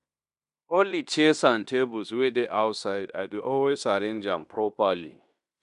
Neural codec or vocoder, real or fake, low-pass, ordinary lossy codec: codec, 16 kHz in and 24 kHz out, 0.9 kbps, LongCat-Audio-Codec, fine tuned four codebook decoder; fake; 10.8 kHz; none